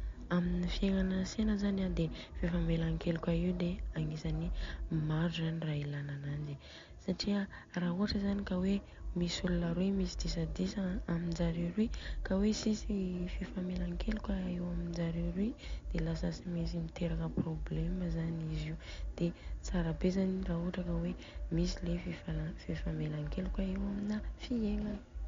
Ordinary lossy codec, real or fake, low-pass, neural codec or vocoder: MP3, 48 kbps; real; 7.2 kHz; none